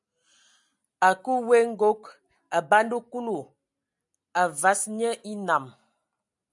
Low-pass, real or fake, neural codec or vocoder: 10.8 kHz; real; none